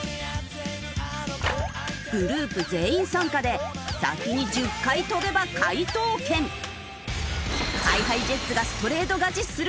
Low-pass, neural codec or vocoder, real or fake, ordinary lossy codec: none; none; real; none